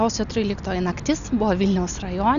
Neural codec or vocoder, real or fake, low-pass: none; real; 7.2 kHz